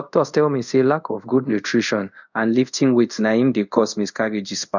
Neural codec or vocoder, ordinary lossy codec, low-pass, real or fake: codec, 24 kHz, 0.5 kbps, DualCodec; none; 7.2 kHz; fake